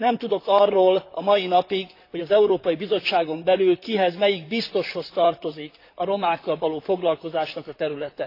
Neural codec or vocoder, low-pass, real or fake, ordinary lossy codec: vocoder, 44.1 kHz, 128 mel bands, Pupu-Vocoder; 5.4 kHz; fake; AAC, 32 kbps